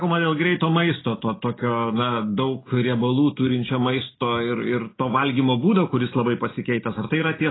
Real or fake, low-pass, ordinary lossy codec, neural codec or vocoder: real; 7.2 kHz; AAC, 16 kbps; none